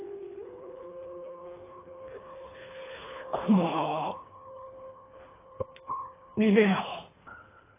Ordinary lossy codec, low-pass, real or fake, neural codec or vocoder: AAC, 16 kbps; 3.6 kHz; fake; codec, 24 kHz, 3 kbps, HILCodec